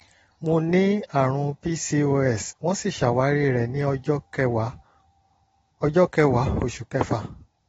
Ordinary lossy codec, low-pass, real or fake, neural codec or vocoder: AAC, 24 kbps; 19.8 kHz; real; none